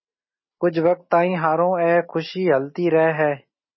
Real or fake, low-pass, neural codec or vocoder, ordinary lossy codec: real; 7.2 kHz; none; MP3, 24 kbps